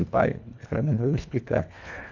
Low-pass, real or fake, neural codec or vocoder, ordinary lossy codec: 7.2 kHz; fake; codec, 24 kHz, 1.5 kbps, HILCodec; none